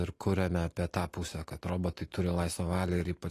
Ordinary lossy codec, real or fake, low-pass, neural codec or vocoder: AAC, 48 kbps; real; 14.4 kHz; none